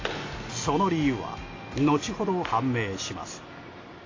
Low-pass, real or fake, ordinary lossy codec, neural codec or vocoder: 7.2 kHz; real; AAC, 32 kbps; none